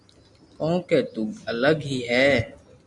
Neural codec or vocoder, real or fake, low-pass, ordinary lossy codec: none; real; 10.8 kHz; MP3, 64 kbps